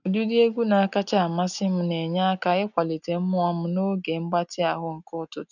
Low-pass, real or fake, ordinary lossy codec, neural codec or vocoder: 7.2 kHz; real; none; none